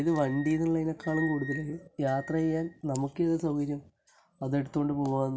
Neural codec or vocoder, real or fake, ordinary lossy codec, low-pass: none; real; none; none